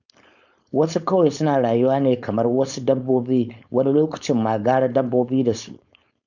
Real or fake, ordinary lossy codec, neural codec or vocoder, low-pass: fake; none; codec, 16 kHz, 4.8 kbps, FACodec; 7.2 kHz